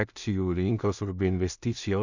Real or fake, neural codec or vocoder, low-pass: fake; codec, 16 kHz in and 24 kHz out, 0.4 kbps, LongCat-Audio-Codec, two codebook decoder; 7.2 kHz